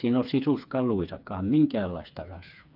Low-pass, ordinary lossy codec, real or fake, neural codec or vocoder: 5.4 kHz; none; fake; codec, 16 kHz, 4 kbps, FreqCodec, smaller model